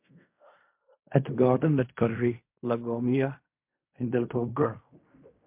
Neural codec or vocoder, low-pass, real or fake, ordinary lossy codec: codec, 16 kHz in and 24 kHz out, 0.4 kbps, LongCat-Audio-Codec, fine tuned four codebook decoder; 3.6 kHz; fake; MP3, 32 kbps